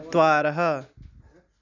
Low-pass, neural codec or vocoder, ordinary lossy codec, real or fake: 7.2 kHz; none; none; real